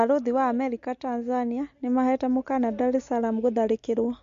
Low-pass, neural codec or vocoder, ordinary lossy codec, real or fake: 7.2 kHz; none; MP3, 48 kbps; real